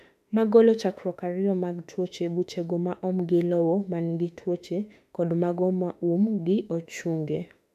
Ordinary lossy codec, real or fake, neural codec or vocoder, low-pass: none; fake; autoencoder, 48 kHz, 32 numbers a frame, DAC-VAE, trained on Japanese speech; 14.4 kHz